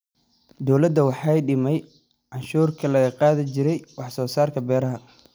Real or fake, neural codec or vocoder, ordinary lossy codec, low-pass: real; none; none; none